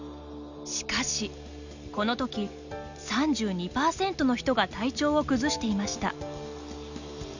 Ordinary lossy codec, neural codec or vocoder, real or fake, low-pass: none; none; real; 7.2 kHz